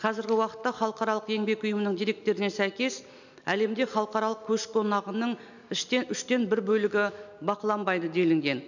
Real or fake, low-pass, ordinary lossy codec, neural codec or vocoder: real; 7.2 kHz; none; none